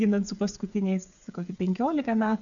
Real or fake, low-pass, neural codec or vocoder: fake; 7.2 kHz; codec, 16 kHz, 8 kbps, FreqCodec, smaller model